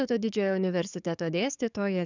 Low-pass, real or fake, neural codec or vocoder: 7.2 kHz; fake; codec, 44.1 kHz, 7.8 kbps, DAC